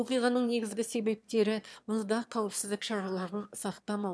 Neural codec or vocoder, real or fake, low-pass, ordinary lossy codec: autoencoder, 22.05 kHz, a latent of 192 numbers a frame, VITS, trained on one speaker; fake; none; none